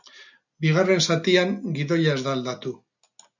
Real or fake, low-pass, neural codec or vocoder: real; 7.2 kHz; none